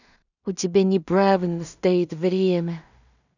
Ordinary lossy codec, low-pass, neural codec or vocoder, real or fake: none; 7.2 kHz; codec, 16 kHz in and 24 kHz out, 0.4 kbps, LongCat-Audio-Codec, two codebook decoder; fake